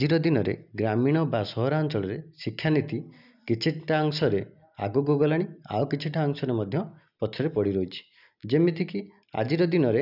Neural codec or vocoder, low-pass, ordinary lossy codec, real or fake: none; 5.4 kHz; none; real